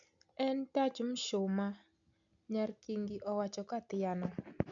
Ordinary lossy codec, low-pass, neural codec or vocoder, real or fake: none; 7.2 kHz; none; real